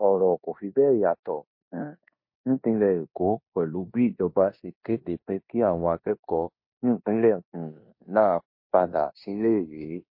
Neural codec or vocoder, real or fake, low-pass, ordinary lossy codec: codec, 16 kHz in and 24 kHz out, 0.9 kbps, LongCat-Audio-Codec, four codebook decoder; fake; 5.4 kHz; MP3, 32 kbps